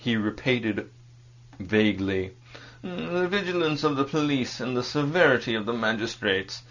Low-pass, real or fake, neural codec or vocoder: 7.2 kHz; real; none